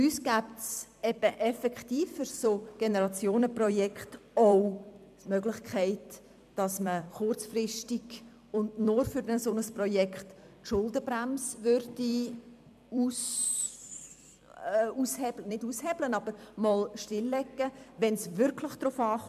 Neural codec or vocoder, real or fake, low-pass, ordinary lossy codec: vocoder, 44.1 kHz, 128 mel bands every 256 samples, BigVGAN v2; fake; 14.4 kHz; MP3, 96 kbps